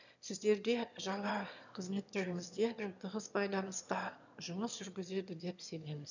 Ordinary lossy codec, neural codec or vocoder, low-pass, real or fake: none; autoencoder, 22.05 kHz, a latent of 192 numbers a frame, VITS, trained on one speaker; 7.2 kHz; fake